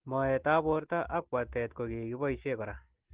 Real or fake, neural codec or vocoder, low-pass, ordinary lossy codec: real; none; 3.6 kHz; Opus, 16 kbps